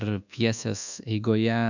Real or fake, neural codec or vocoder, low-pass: fake; codec, 24 kHz, 1.2 kbps, DualCodec; 7.2 kHz